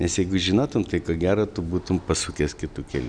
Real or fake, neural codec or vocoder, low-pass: real; none; 9.9 kHz